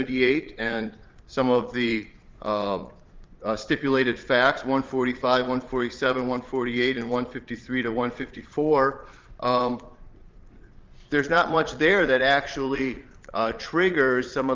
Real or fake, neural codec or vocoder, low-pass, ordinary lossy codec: fake; vocoder, 22.05 kHz, 80 mel bands, Vocos; 7.2 kHz; Opus, 32 kbps